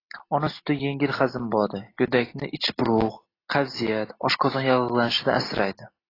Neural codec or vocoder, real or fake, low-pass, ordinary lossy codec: none; real; 5.4 kHz; AAC, 24 kbps